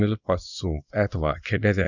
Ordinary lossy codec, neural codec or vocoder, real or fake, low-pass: none; codec, 24 kHz, 0.9 kbps, WavTokenizer, small release; fake; 7.2 kHz